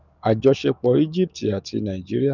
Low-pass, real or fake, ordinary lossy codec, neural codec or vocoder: 7.2 kHz; fake; none; codec, 44.1 kHz, 7.8 kbps, DAC